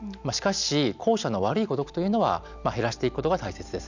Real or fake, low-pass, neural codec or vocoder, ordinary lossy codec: real; 7.2 kHz; none; none